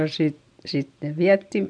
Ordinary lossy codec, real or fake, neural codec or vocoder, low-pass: none; real; none; 9.9 kHz